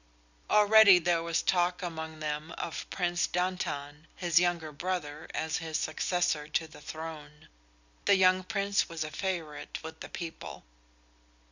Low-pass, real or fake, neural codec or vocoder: 7.2 kHz; real; none